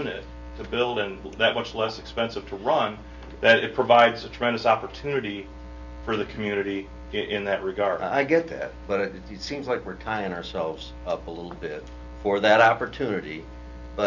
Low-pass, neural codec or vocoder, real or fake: 7.2 kHz; none; real